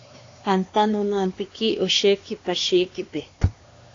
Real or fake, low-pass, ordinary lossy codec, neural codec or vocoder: fake; 7.2 kHz; AAC, 32 kbps; codec, 16 kHz, 2 kbps, X-Codec, HuBERT features, trained on LibriSpeech